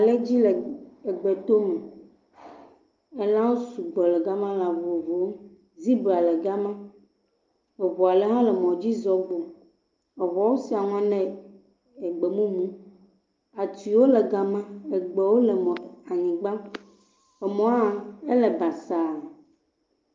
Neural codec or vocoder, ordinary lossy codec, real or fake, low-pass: none; Opus, 32 kbps; real; 7.2 kHz